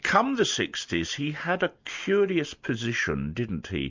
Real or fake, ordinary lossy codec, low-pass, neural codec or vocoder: real; MP3, 64 kbps; 7.2 kHz; none